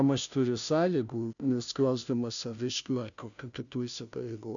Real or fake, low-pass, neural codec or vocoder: fake; 7.2 kHz; codec, 16 kHz, 0.5 kbps, FunCodec, trained on Chinese and English, 25 frames a second